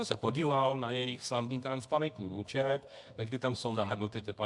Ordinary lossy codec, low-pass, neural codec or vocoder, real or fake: AAC, 64 kbps; 10.8 kHz; codec, 24 kHz, 0.9 kbps, WavTokenizer, medium music audio release; fake